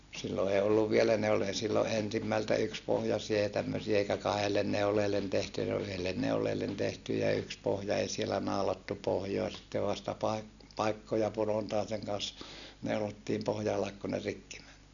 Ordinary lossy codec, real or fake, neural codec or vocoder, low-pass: none; real; none; 7.2 kHz